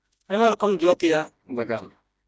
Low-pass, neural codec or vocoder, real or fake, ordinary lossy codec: none; codec, 16 kHz, 1 kbps, FreqCodec, smaller model; fake; none